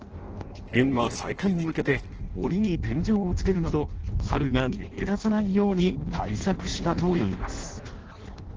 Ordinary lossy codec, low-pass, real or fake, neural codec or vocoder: Opus, 16 kbps; 7.2 kHz; fake; codec, 16 kHz in and 24 kHz out, 0.6 kbps, FireRedTTS-2 codec